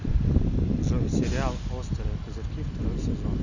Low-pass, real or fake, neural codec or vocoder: 7.2 kHz; real; none